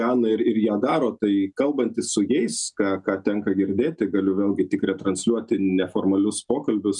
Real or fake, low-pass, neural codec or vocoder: real; 10.8 kHz; none